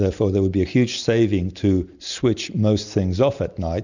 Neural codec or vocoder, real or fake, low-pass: none; real; 7.2 kHz